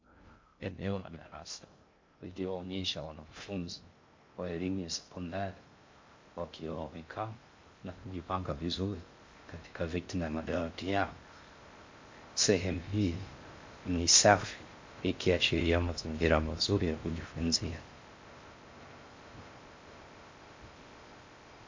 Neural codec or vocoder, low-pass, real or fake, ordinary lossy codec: codec, 16 kHz in and 24 kHz out, 0.6 kbps, FocalCodec, streaming, 2048 codes; 7.2 kHz; fake; MP3, 48 kbps